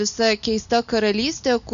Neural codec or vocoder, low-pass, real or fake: none; 7.2 kHz; real